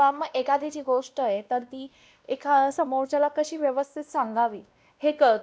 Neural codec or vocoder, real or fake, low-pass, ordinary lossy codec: codec, 16 kHz, 1 kbps, X-Codec, WavLM features, trained on Multilingual LibriSpeech; fake; none; none